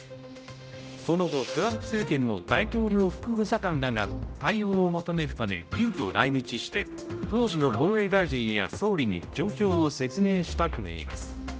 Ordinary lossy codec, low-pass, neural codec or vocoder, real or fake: none; none; codec, 16 kHz, 0.5 kbps, X-Codec, HuBERT features, trained on general audio; fake